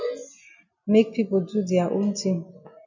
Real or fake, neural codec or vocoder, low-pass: real; none; 7.2 kHz